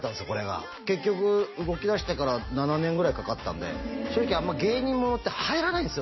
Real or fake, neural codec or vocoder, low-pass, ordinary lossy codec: real; none; 7.2 kHz; MP3, 24 kbps